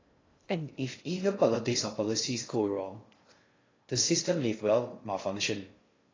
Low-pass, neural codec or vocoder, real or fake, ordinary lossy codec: 7.2 kHz; codec, 16 kHz in and 24 kHz out, 0.6 kbps, FocalCodec, streaming, 2048 codes; fake; AAC, 32 kbps